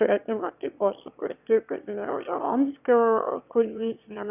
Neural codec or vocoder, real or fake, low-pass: autoencoder, 22.05 kHz, a latent of 192 numbers a frame, VITS, trained on one speaker; fake; 3.6 kHz